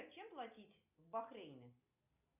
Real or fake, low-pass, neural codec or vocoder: real; 3.6 kHz; none